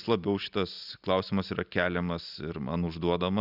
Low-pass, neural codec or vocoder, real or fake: 5.4 kHz; none; real